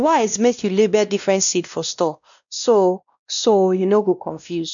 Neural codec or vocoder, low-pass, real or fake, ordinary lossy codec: codec, 16 kHz, 1 kbps, X-Codec, WavLM features, trained on Multilingual LibriSpeech; 7.2 kHz; fake; none